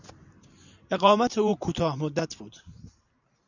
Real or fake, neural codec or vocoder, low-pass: fake; vocoder, 44.1 kHz, 128 mel bands every 512 samples, BigVGAN v2; 7.2 kHz